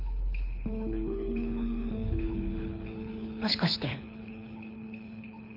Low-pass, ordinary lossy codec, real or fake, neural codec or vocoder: 5.4 kHz; none; fake; codec, 24 kHz, 6 kbps, HILCodec